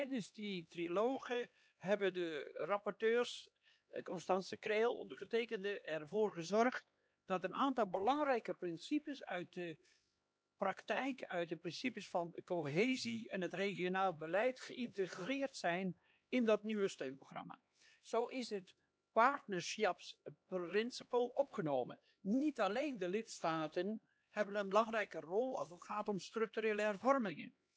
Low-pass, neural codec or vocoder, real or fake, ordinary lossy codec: none; codec, 16 kHz, 2 kbps, X-Codec, HuBERT features, trained on LibriSpeech; fake; none